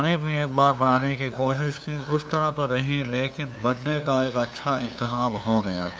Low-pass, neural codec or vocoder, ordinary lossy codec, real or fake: none; codec, 16 kHz, 2 kbps, FunCodec, trained on LibriTTS, 25 frames a second; none; fake